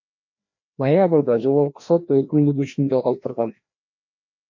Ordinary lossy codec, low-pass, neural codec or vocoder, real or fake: MP3, 48 kbps; 7.2 kHz; codec, 16 kHz, 1 kbps, FreqCodec, larger model; fake